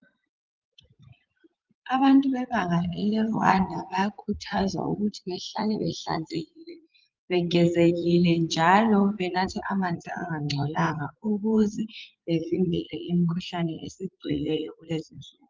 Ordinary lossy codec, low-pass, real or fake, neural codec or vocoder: Opus, 24 kbps; 7.2 kHz; fake; vocoder, 44.1 kHz, 80 mel bands, Vocos